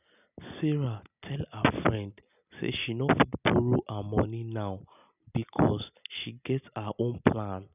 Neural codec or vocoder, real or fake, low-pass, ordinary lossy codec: none; real; 3.6 kHz; none